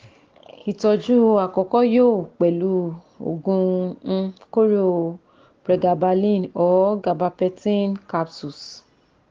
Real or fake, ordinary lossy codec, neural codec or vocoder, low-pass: real; Opus, 16 kbps; none; 7.2 kHz